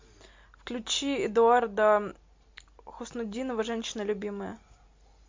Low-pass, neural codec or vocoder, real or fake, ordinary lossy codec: 7.2 kHz; none; real; MP3, 64 kbps